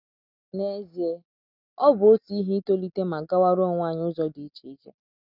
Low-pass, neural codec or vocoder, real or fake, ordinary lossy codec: 5.4 kHz; none; real; none